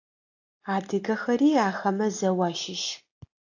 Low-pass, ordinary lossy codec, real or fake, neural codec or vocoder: 7.2 kHz; AAC, 48 kbps; real; none